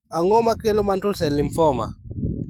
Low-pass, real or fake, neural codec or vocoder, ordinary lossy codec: 19.8 kHz; fake; codec, 44.1 kHz, 7.8 kbps, DAC; none